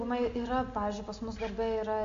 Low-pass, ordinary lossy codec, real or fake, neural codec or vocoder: 7.2 kHz; AAC, 48 kbps; real; none